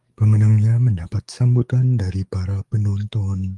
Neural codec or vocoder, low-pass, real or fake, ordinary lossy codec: codec, 44.1 kHz, 7.8 kbps, DAC; 10.8 kHz; fake; Opus, 24 kbps